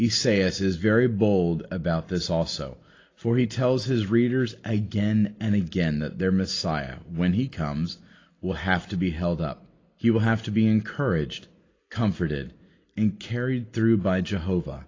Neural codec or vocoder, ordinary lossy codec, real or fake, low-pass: none; AAC, 32 kbps; real; 7.2 kHz